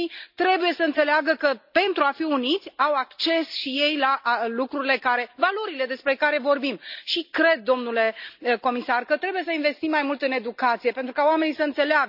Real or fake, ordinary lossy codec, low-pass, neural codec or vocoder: real; none; 5.4 kHz; none